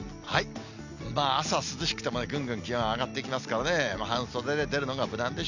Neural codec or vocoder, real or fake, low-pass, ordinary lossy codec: none; real; 7.2 kHz; none